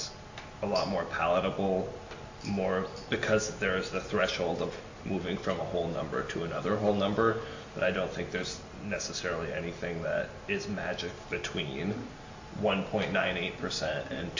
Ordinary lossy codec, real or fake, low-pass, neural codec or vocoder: AAC, 48 kbps; real; 7.2 kHz; none